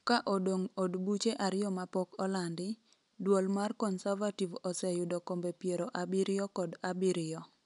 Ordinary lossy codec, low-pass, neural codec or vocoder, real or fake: none; 10.8 kHz; none; real